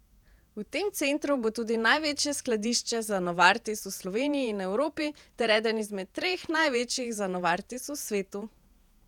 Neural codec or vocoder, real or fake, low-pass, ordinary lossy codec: vocoder, 48 kHz, 128 mel bands, Vocos; fake; 19.8 kHz; none